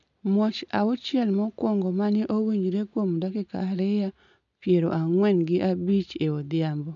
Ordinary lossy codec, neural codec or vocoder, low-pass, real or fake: none; none; 7.2 kHz; real